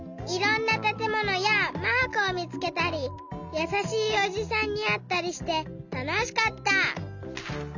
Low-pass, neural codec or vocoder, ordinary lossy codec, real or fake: 7.2 kHz; none; none; real